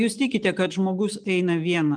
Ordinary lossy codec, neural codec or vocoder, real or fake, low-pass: Opus, 32 kbps; none; real; 9.9 kHz